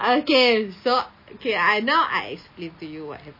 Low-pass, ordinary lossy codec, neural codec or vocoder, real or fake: 5.4 kHz; MP3, 24 kbps; none; real